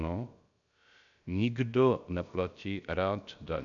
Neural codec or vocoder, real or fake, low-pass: codec, 16 kHz, about 1 kbps, DyCAST, with the encoder's durations; fake; 7.2 kHz